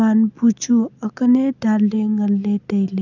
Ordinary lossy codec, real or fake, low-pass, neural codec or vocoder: none; real; 7.2 kHz; none